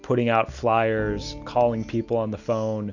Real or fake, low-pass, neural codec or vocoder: real; 7.2 kHz; none